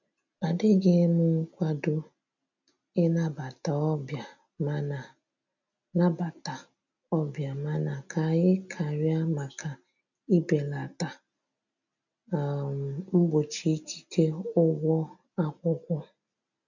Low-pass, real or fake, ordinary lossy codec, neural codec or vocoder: 7.2 kHz; real; none; none